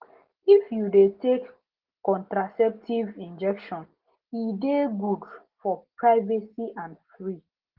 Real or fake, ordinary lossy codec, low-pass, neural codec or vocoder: real; Opus, 16 kbps; 5.4 kHz; none